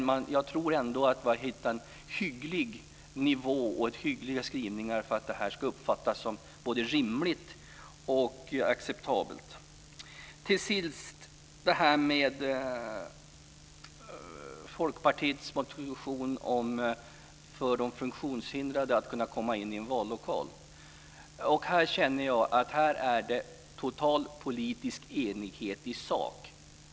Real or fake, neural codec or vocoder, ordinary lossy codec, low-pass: real; none; none; none